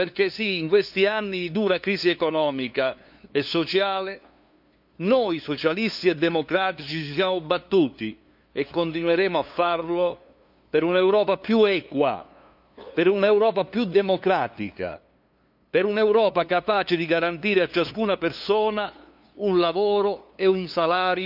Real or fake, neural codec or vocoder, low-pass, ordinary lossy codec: fake; codec, 16 kHz, 2 kbps, FunCodec, trained on LibriTTS, 25 frames a second; 5.4 kHz; none